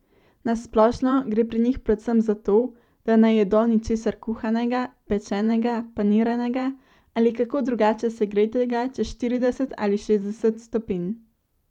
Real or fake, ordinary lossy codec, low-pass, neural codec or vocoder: fake; none; 19.8 kHz; vocoder, 44.1 kHz, 128 mel bands every 512 samples, BigVGAN v2